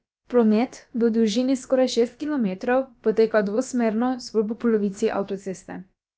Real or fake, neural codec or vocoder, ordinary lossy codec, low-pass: fake; codec, 16 kHz, about 1 kbps, DyCAST, with the encoder's durations; none; none